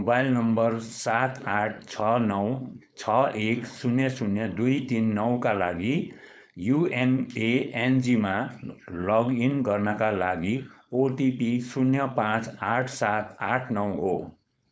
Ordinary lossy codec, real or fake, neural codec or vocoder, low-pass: none; fake; codec, 16 kHz, 4.8 kbps, FACodec; none